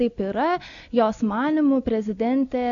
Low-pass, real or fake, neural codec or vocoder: 7.2 kHz; real; none